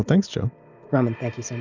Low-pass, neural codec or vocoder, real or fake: 7.2 kHz; none; real